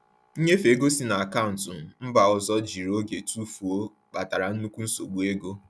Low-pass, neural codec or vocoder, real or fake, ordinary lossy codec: none; none; real; none